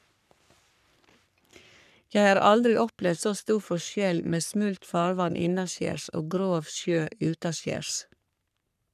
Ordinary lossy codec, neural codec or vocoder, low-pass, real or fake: none; codec, 44.1 kHz, 3.4 kbps, Pupu-Codec; 14.4 kHz; fake